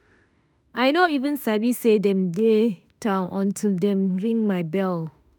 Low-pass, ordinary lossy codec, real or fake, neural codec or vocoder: none; none; fake; autoencoder, 48 kHz, 32 numbers a frame, DAC-VAE, trained on Japanese speech